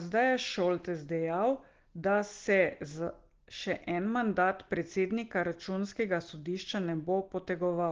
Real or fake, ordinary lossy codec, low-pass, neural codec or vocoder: real; Opus, 16 kbps; 7.2 kHz; none